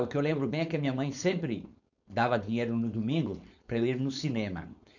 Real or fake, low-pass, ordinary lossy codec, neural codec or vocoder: fake; 7.2 kHz; none; codec, 16 kHz, 4.8 kbps, FACodec